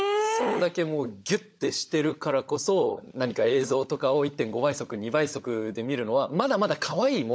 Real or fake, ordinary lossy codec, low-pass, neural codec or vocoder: fake; none; none; codec, 16 kHz, 16 kbps, FunCodec, trained on LibriTTS, 50 frames a second